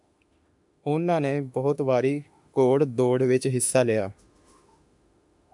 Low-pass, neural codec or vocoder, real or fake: 10.8 kHz; autoencoder, 48 kHz, 32 numbers a frame, DAC-VAE, trained on Japanese speech; fake